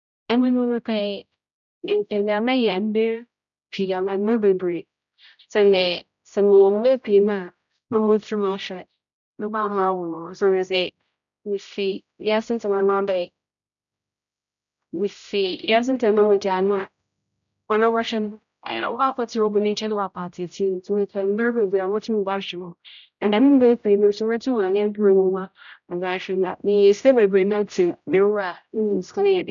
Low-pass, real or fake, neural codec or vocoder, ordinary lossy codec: 7.2 kHz; fake; codec, 16 kHz, 0.5 kbps, X-Codec, HuBERT features, trained on general audio; none